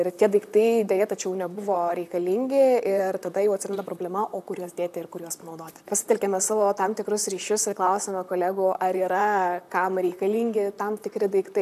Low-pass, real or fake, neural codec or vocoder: 14.4 kHz; fake; vocoder, 44.1 kHz, 128 mel bands, Pupu-Vocoder